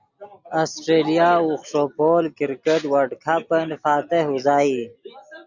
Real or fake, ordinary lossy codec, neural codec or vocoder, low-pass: real; Opus, 64 kbps; none; 7.2 kHz